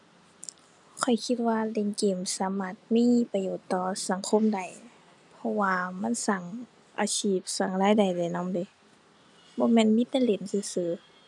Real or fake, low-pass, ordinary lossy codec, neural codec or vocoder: real; 10.8 kHz; none; none